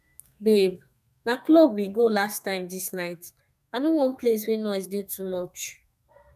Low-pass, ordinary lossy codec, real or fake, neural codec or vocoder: 14.4 kHz; none; fake; codec, 32 kHz, 1.9 kbps, SNAC